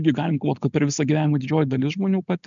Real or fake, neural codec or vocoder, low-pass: real; none; 7.2 kHz